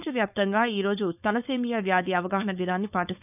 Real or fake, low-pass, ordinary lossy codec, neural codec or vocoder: fake; 3.6 kHz; none; codec, 16 kHz, 4.8 kbps, FACodec